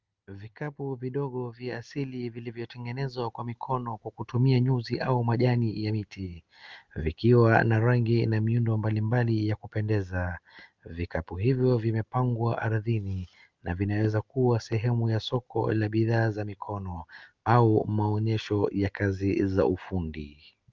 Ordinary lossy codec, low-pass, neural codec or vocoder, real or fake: Opus, 32 kbps; 7.2 kHz; none; real